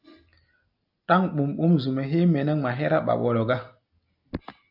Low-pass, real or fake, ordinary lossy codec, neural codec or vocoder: 5.4 kHz; real; AAC, 48 kbps; none